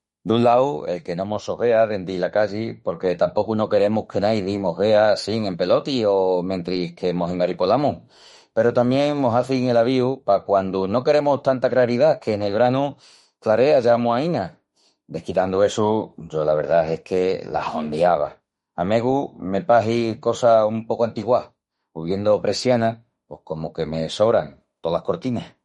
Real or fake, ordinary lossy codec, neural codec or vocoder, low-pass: fake; MP3, 48 kbps; autoencoder, 48 kHz, 32 numbers a frame, DAC-VAE, trained on Japanese speech; 19.8 kHz